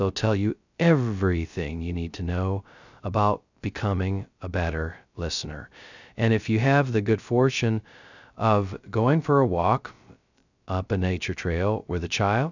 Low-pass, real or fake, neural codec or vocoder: 7.2 kHz; fake; codec, 16 kHz, 0.2 kbps, FocalCodec